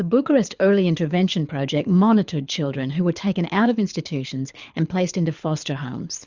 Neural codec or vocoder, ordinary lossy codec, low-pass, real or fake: codec, 24 kHz, 6 kbps, HILCodec; Opus, 64 kbps; 7.2 kHz; fake